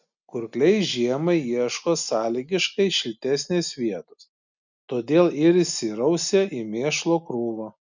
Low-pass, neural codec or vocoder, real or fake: 7.2 kHz; none; real